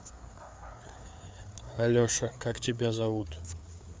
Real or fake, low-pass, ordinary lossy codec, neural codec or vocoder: fake; none; none; codec, 16 kHz, 4 kbps, FreqCodec, larger model